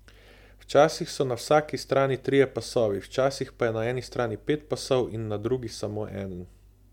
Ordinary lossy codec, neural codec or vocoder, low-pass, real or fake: MP3, 96 kbps; none; 19.8 kHz; real